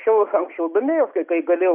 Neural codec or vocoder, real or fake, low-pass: none; real; 3.6 kHz